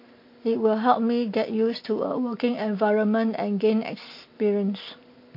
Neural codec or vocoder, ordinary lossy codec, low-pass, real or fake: none; MP3, 32 kbps; 5.4 kHz; real